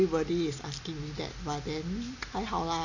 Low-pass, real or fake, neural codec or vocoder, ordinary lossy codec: 7.2 kHz; real; none; none